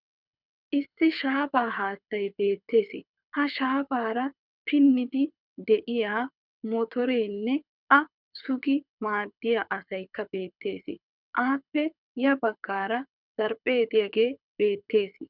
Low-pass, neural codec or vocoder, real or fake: 5.4 kHz; codec, 24 kHz, 6 kbps, HILCodec; fake